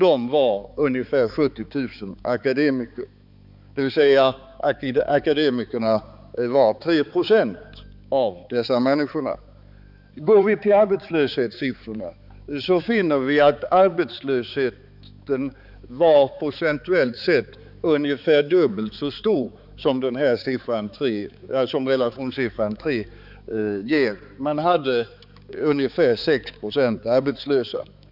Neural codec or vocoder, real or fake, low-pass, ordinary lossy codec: codec, 16 kHz, 4 kbps, X-Codec, HuBERT features, trained on balanced general audio; fake; 5.4 kHz; none